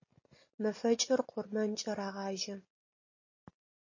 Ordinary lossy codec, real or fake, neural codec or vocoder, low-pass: MP3, 32 kbps; real; none; 7.2 kHz